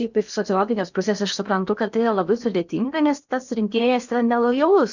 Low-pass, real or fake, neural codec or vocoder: 7.2 kHz; fake; codec, 16 kHz in and 24 kHz out, 0.8 kbps, FocalCodec, streaming, 65536 codes